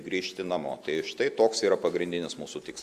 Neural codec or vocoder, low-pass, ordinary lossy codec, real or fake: none; 14.4 kHz; Opus, 64 kbps; real